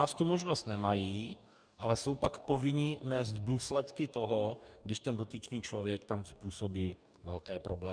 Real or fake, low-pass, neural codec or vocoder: fake; 9.9 kHz; codec, 44.1 kHz, 2.6 kbps, DAC